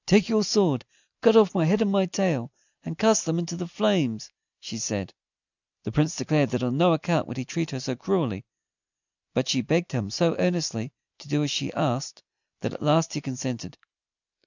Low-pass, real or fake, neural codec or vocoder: 7.2 kHz; real; none